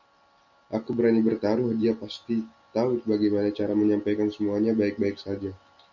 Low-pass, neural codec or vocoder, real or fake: 7.2 kHz; none; real